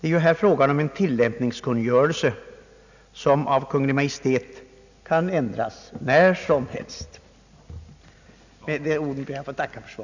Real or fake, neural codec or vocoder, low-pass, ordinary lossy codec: real; none; 7.2 kHz; none